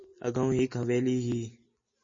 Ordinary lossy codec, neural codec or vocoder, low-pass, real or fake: MP3, 32 kbps; none; 7.2 kHz; real